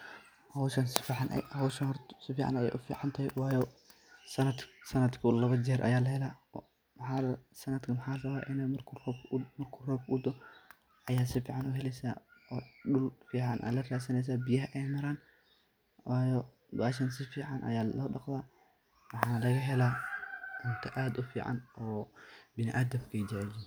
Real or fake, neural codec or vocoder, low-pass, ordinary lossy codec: real; none; none; none